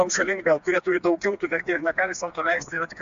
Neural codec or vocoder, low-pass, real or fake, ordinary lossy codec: codec, 16 kHz, 2 kbps, FreqCodec, smaller model; 7.2 kHz; fake; AAC, 64 kbps